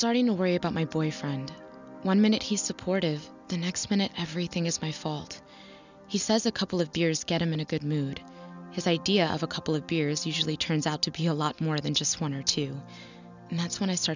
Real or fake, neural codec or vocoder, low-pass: real; none; 7.2 kHz